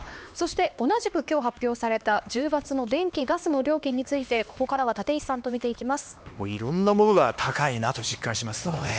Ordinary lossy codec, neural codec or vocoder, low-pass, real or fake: none; codec, 16 kHz, 2 kbps, X-Codec, HuBERT features, trained on LibriSpeech; none; fake